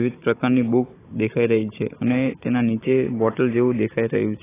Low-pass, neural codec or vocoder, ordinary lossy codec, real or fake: 3.6 kHz; codec, 16 kHz, 16 kbps, FunCodec, trained on Chinese and English, 50 frames a second; AAC, 16 kbps; fake